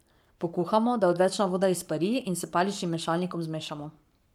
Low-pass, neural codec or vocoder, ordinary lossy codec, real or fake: 19.8 kHz; codec, 44.1 kHz, 7.8 kbps, Pupu-Codec; MP3, 96 kbps; fake